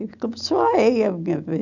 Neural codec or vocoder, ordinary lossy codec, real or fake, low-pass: none; none; real; 7.2 kHz